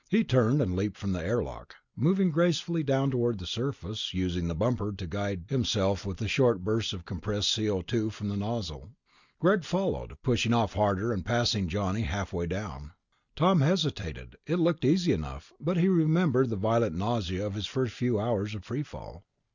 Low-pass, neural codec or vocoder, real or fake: 7.2 kHz; none; real